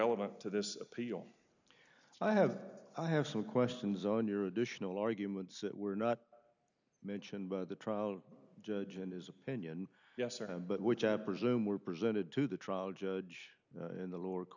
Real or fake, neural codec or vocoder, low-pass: real; none; 7.2 kHz